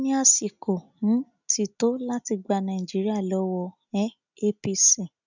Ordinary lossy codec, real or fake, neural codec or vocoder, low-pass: none; real; none; 7.2 kHz